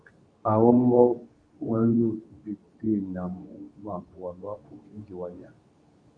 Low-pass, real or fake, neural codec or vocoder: 9.9 kHz; fake; codec, 24 kHz, 0.9 kbps, WavTokenizer, medium speech release version 2